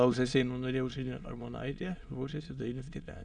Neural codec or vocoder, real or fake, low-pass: autoencoder, 22.05 kHz, a latent of 192 numbers a frame, VITS, trained on many speakers; fake; 9.9 kHz